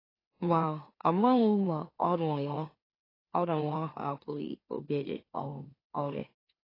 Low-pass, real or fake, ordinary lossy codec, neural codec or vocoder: 5.4 kHz; fake; AAC, 24 kbps; autoencoder, 44.1 kHz, a latent of 192 numbers a frame, MeloTTS